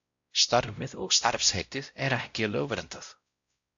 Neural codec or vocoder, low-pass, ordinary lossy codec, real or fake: codec, 16 kHz, 0.5 kbps, X-Codec, WavLM features, trained on Multilingual LibriSpeech; 7.2 kHz; AAC, 64 kbps; fake